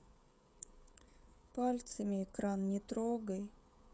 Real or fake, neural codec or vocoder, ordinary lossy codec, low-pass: fake; codec, 16 kHz, 16 kbps, FreqCodec, smaller model; none; none